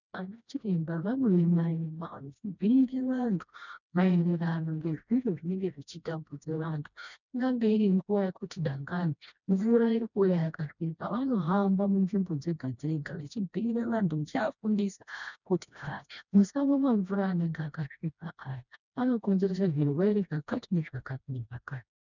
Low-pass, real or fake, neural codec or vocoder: 7.2 kHz; fake; codec, 16 kHz, 1 kbps, FreqCodec, smaller model